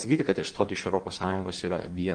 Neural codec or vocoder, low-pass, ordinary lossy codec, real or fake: codec, 16 kHz in and 24 kHz out, 1.1 kbps, FireRedTTS-2 codec; 9.9 kHz; Opus, 24 kbps; fake